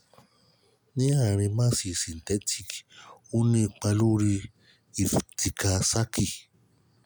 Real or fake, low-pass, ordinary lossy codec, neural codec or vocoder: real; none; none; none